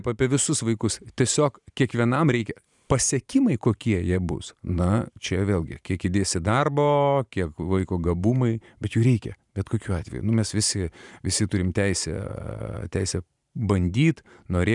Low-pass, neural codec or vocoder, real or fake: 10.8 kHz; vocoder, 44.1 kHz, 128 mel bands every 512 samples, BigVGAN v2; fake